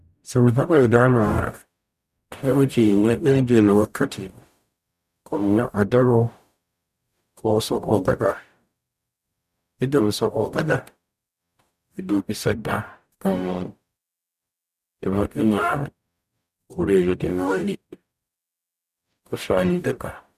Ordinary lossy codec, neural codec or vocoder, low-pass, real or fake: none; codec, 44.1 kHz, 0.9 kbps, DAC; 14.4 kHz; fake